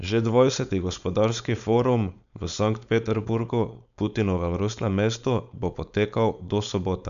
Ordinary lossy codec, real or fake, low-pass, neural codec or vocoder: none; fake; 7.2 kHz; codec, 16 kHz, 4.8 kbps, FACodec